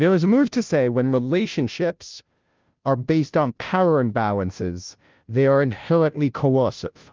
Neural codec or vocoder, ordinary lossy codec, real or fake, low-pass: codec, 16 kHz, 0.5 kbps, FunCodec, trained on Chinese and English, 25 frames a second; Opus, 32 kbps; fake; 7.2 kHz